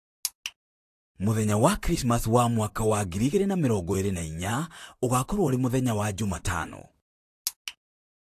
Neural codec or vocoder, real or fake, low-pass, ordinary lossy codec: vocoder, 44.1 kHz, 128 mel bands, Pupu-Vocoder; fake; 14.4 kHz; AAC, 64 kbps